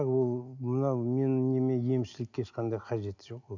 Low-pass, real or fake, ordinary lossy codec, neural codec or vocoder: 7.2 kHz; real; none; none